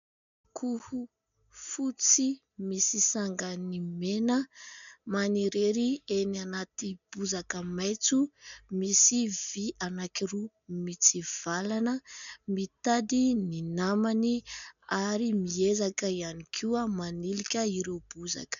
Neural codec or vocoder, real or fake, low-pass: none; real; 7.2 kHz